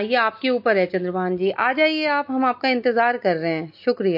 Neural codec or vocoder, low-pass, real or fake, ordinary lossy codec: none; 5.4 kHz; real; MP3, 32 kbps